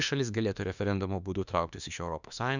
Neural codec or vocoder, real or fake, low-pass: autoencoder, 48 kHz, 32 numbers a frame, DAC-VAE, trained on Japanese speech; fake; 7.2 kHz